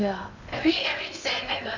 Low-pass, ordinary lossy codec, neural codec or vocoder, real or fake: 7.2 kHz; none; codec, 16 kHz in and 24 kHz out, 0.8 kbps, FocalCodec, streaming, 65536 codes; fake